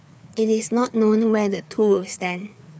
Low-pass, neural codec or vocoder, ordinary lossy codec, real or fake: none; codec, 16 kHz, 4 kbps, FreqCodec, larger model; none; fake